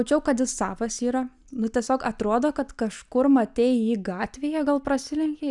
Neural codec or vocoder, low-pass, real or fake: none; 10.8 kHz; real